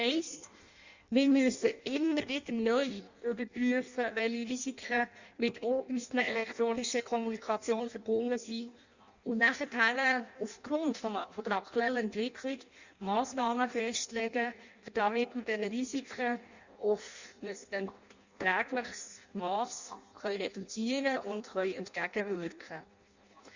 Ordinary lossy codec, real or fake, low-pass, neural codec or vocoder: Opus, 64 kbps; fake; 7.2 kHz; codec, 16 kHz in and 24 kHz out, 0.6 kbps, FireRedTTS-2 codec